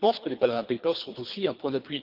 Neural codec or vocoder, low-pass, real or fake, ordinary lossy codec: codec, 24 kHz, 3 kbps, HILCodec; 5.4 kHz; fake; Opus, 24 kbps